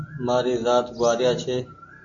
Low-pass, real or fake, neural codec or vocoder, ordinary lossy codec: 7.2 kHz; real; none; AAC, 48 kbps